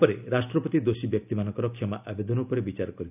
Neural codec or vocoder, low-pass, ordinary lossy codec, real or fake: none; 3.6 kHz; none; real